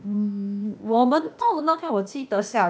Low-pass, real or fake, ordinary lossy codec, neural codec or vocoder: none; fake; none; codec, 16 kHz, 0.8 kbps, ZipCodec